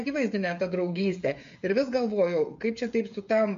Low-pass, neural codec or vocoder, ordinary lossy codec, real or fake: 7.2 kHz; codec, 16 kHz, 16 kbps, FreqCodec, smaller model; MP3, 48 kbps; fake